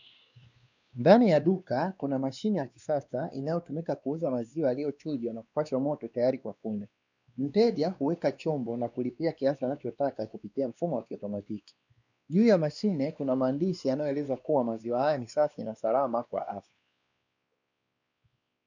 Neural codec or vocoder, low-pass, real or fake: codec, 16 kHz, 2 kbps, X-Codec, WavLM features, trained on Multilingual LibriSpeech; 7.2 kHz; fake